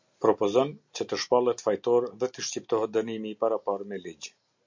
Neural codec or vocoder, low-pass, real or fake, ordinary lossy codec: none; 7.2 kHz; real; MP3, 64 kbps